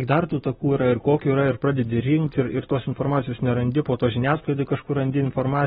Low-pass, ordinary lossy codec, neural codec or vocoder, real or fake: 14.4 kHz; AAC, 16 kbps; none; real